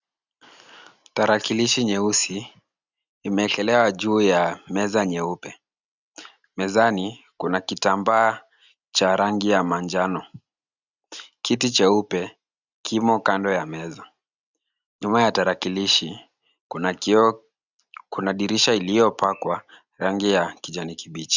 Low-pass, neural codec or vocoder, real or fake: 7.2 kHz; none; real